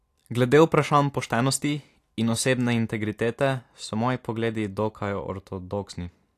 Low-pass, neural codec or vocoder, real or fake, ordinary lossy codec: 14.4 kHz; none; real; AAC, 64 kbps